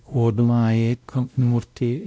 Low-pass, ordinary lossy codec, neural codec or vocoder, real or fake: none; none; codec, 16 kHz, 0.5 kbps, X-Codec, WavLM features, trained on Multilingual LibriSpeech; fake